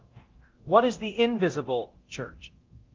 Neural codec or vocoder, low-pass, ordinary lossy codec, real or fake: codec, 24 kHz, 0.9 kbps, DualCodec; 7.2 kHz; Opus, 32 kbps; fake